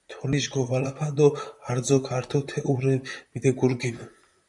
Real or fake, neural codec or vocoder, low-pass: fake; vocoder, 44.1 kHz, 128 mel bands, Pupu-Vocoder; 10.8 kHz